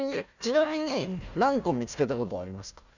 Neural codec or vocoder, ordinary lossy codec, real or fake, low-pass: codec, 16 kHz, 1 kbps, FunCodec, trained on Chinese and English, 50 frames a second; none; fake; 7.2 kHz